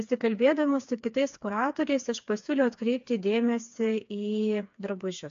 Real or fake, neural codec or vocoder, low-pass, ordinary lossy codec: fake; codec, 16 kHz, 4 kbps, FreqCodec, smaller model; 7.2 kHz; AAC, 64 kbps